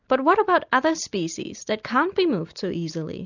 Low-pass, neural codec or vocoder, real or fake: 7.2 kHz; none; real